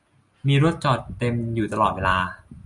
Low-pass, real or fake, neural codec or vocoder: 10.8 kHz; real; none